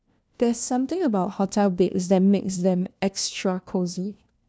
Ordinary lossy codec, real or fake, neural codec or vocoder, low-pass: none; fake; codec, 16 kHz, 1 kbps, FunCodec, trained on LibriTTS, 50 frames a second; none